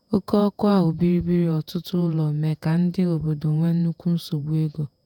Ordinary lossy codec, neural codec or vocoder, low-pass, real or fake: none; vocoder, 48 kHz, 128 mel bands, Vocos; 19.8 kHz; fake